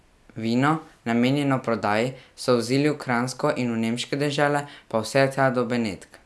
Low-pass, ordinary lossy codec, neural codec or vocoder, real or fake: none; none; none; real